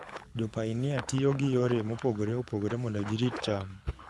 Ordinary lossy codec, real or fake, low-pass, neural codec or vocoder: none; fake; none; codec, 24 kHz, 6 kbps, HILCodec